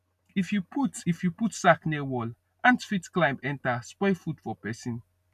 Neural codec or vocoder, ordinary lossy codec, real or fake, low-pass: none; none; real; 14.4 kHz